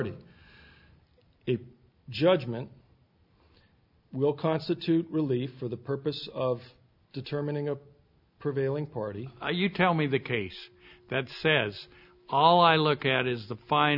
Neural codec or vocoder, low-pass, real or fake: none; 5.4 kHz; real